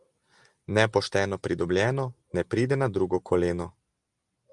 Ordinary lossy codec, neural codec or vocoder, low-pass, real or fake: Opus, 32 kbps; none; 10.8 kHz; real